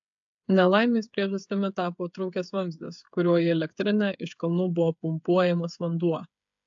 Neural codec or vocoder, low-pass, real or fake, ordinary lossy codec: codec, 16 kHz, 8 kbps, FreqCodec, smaller model; 7.2 kHz; fake; AAC, 64 kbps